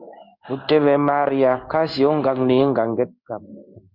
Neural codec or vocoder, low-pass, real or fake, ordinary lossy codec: codec, 16 kHz in and 24 kHz out, 1 kbps, XY-Tokenizer; 5.4 kHz; fake; Opus, 64 kbps